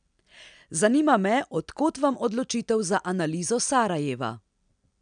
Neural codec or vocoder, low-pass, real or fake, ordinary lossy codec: none; 9.9 kHz; real; none